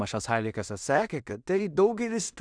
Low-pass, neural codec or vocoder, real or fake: 9.9 kHz; codec, 16 kHz in and 24 kHz out, 0.4 kbps, LongCat-Audio-Codec, two codebook decoder; fake